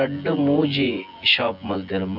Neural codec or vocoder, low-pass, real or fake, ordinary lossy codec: vocoder, 24 kHz, 100 mel bands, Vocos; 5.4 kHz; fake; none